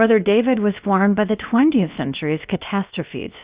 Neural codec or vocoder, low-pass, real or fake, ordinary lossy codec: codec, 16 kHz, about 1 kbps, DyCAST, with the encoder's durations; 3.6 kHz; fake; Opus, 64 kbps